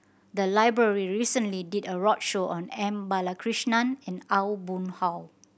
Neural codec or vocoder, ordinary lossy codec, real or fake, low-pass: none; none; real; none